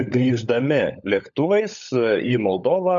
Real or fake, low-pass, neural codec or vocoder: fake; 7.2 kHz; codec, 16 kHz, 16 kbps, FunCodec, trained on LibriTTS, 50 frames a second